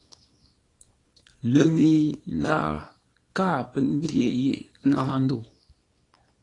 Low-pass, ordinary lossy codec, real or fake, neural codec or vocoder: 10.8 kHz; AAC, 32 kbps; fake; codec, 24 kHz, 0.9 kbps, WavTokenizer, small release